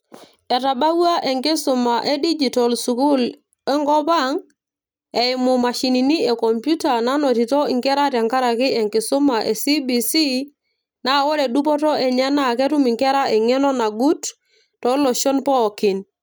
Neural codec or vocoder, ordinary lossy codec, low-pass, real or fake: none; none; none; real